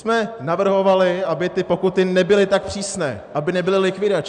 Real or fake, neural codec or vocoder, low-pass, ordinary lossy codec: real; none; 9.9 kHz; MP3, 96 kbps